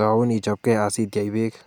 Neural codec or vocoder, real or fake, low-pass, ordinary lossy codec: none; real; 19.8 kHz; none